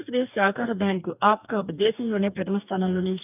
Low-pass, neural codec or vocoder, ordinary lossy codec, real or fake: 3.6 kHz; codec, 44.1 kHz, 2.6 kbps, DAC; none; fake